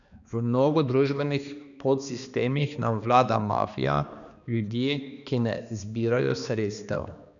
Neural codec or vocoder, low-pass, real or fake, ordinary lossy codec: codec, 16 kHz, 2 kbps, X-Codec, HuBERT features, trained on balanced general audio; 7.2 kHz; fake; none